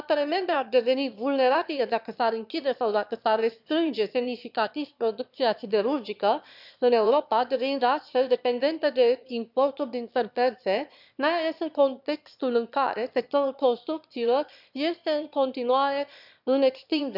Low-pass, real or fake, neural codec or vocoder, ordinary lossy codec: 5.4 kHz; fake; autoencoder, 22.05 kHz, a latent of 192 numbers a frame, VITS, trained on one speaker; none